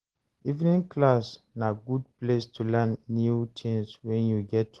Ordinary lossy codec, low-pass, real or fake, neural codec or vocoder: Opus, 16 kbps; 10.8 kHz; real; none